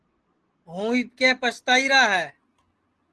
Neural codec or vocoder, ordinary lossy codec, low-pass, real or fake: none; Opus, 16 kbps; 10.8 kHz; real